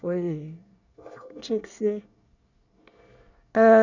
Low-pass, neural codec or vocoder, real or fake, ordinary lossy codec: 7.2 kHz; codec, 24 kHz, 1 kbps, SNAC; fake; none